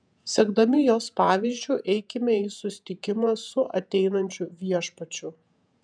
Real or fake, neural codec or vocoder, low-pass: fake; vocoder, 48 kHz, 128 mel bands, Vocos; 9.9 kHz